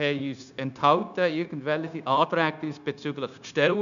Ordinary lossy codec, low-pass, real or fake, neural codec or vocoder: none; 7.2 kHz; fake; codec, 16 kHz, 0.9 kbps, LongCat-Audio-Codec